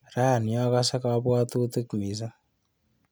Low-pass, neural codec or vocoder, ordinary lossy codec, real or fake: none; none; none; real